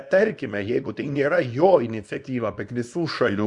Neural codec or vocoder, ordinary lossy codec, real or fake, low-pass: codec, 24 kHz, 0.9 kbps, WavTokenizer, small release; Opus, 64 kbps; fake; 10.8 kHz